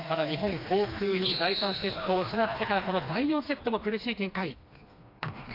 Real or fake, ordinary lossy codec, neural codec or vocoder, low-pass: fake; AAC, 32 kbps; codec, 16 kHz, 2 kbps, FreqCodec, smaller model; 5.4 kHz